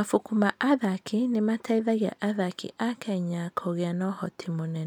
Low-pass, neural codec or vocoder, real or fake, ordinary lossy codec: 19.8 kHz; none; real; none